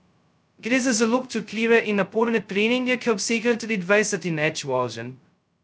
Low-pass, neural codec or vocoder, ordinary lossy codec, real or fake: none; codec, 16 kHz, 0.2 kbps, FocalCodec; none; fake